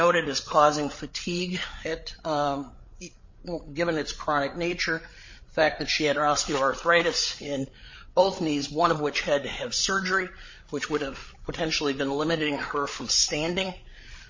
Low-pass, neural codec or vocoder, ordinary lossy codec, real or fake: 7.2 kHz; codec, 16 kHz, 8 kbps, FreqCodec, larger model; MP3, 32 kbps; fake